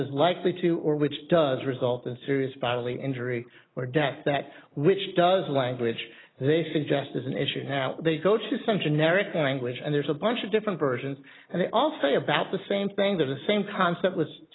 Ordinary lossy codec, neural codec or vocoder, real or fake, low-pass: AAC, 16 kbps; none; real; 7.2 kHz